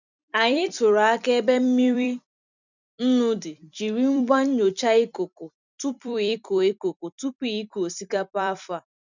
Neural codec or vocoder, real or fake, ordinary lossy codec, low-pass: vocoder, 44.1 kHz, 128 mel bands every 256 samples, BigVGAN v2; fake; none; 7.2 kHz